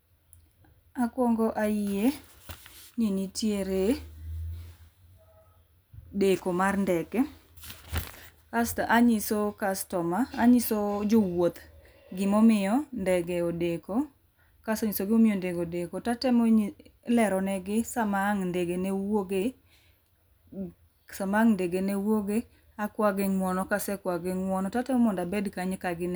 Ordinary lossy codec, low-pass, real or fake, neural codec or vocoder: none; none; real; none